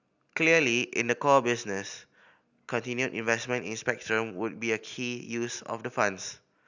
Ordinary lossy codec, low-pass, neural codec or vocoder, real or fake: none; 7.2 kHz; none; real